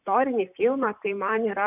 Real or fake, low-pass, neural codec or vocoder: fake; 3.6 kHz; vocoder, 44.1 kHz, 128 mel bands, Pupu-Vocoder